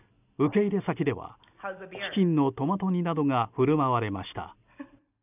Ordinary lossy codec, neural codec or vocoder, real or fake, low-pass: none; none; real; 3.6 kHz